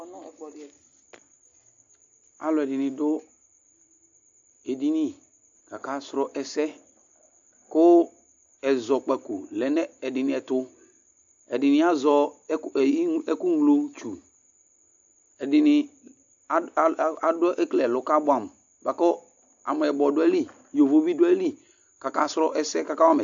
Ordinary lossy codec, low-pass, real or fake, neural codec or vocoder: AAC, 64 kbps; 7.2 kHz; real; none